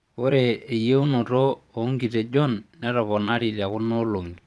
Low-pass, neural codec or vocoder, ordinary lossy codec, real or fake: none; vocoder, 22.05 kHz, 80 mel bands, Vocos; none; fake